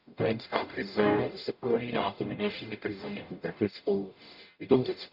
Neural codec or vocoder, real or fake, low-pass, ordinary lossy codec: codec, 44.1 kHz, 0.9 kbps, DAC; fake; 5.4 kHz; none